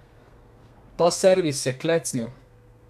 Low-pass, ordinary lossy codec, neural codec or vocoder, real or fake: 14.4 kHz; none; codec, 32 kHz, 1.9 kbps, SNAC; fake